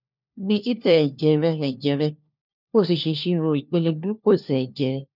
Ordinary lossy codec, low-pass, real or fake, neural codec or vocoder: none; 5.4 kHz; fake; codec, 16 kHz, 1 kbps, FunCodec, trained on LibriTTS, 50 frames a second